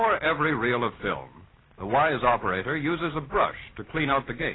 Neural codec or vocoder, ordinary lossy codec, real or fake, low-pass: none; AAC, 16 kbps; real; 7.2 kHz